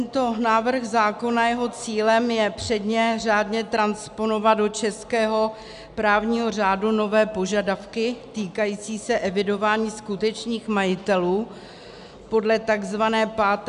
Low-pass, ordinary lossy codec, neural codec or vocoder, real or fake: 10.8 kHz; AAC, 96 kbps; none; real